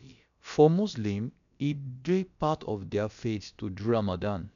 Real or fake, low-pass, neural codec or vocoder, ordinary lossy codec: fake; 7.2 kHz; codec, 16 kHz, about 1 kbps, DyCAST, with the encoder's durations; none